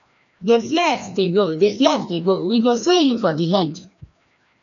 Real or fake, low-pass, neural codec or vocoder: fake; 7.2 kHz; codec, 16 kHz, 1 kbps, FreqCodec, larger model